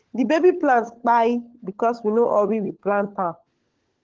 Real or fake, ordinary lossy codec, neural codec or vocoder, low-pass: fake; Opus, 16 kbps; codec, 16 kHz, 16 kbps, FunCodec, trained on LibriTTS, 50 frames a second; 7.2 kHz